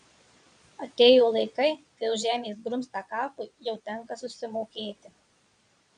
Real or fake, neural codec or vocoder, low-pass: fake; vocoder, 22.05 kHz, 80 mel bands, Vocos; 9.9 kHz